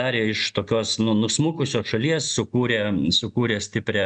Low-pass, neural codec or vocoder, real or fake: 9.9 kHz; none; real